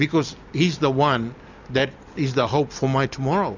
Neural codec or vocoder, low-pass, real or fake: none; 7.2 kHz; real